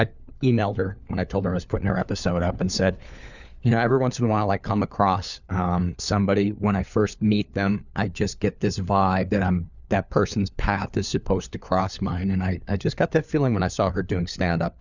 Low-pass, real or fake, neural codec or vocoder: 7.2 kHz; fake; codec, 16 kHz, 4 kbps, FunCodec, trained on LibriTTS, 50 frames a second